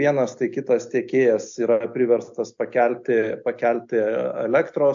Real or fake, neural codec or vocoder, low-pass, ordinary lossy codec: real; none; 7.2 kHz; AAC, 64 kbps